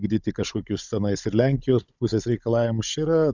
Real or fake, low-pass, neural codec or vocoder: fake; 7.2 kHz; codec, 16 kHz, 16 kbps, FunCodec, trained on Chinese and English, 50 frames a second